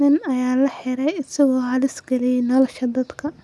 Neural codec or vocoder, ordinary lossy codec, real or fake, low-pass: none; none; real; none